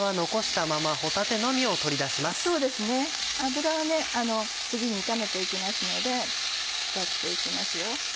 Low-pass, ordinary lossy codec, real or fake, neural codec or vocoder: none; none; real; none